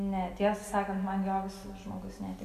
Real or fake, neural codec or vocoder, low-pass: real; none; 14.4 kHz